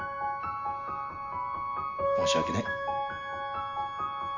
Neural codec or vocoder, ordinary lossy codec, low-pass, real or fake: none; none; 7.2 kHz; real